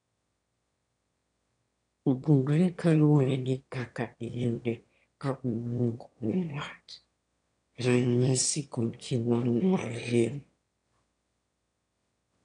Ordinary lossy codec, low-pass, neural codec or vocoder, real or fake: none; 9.9 kHz; autoencoder, 22.05 kHz, a latent of 192 numbers a frame, VITS, trained on one speaker; fake